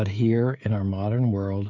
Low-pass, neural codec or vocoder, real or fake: 7.2 kHz; codec, 16 kHz, 16 kbps, FreqCodec, smaller model; fake